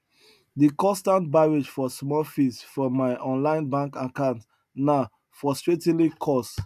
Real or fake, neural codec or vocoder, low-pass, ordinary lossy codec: real; none; 14.4 kHz; none